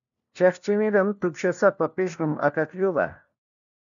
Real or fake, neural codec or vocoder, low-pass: fake; codec, 16 kHz, 1 kbps, FunCodec, trained on LibriTTS, 50 frames a second; 7.2 kHz